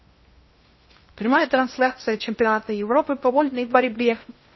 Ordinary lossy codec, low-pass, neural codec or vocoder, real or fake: MP3, 24 kbps; 7.2 kHz; codec, 16 kHz in and 24 kHz out, 0.6 kbps, FocalCodec, streaming, 2048 codes; fake